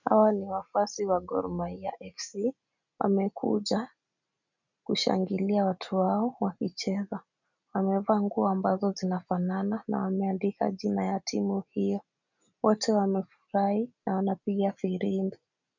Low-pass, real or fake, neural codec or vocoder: 7.2 kHz; real; none